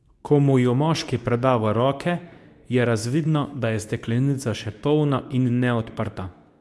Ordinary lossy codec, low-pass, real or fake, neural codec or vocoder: none; none; fake; codec, 24 kHz, 0.9 kbps, WavTokenizer, medium speech release version 2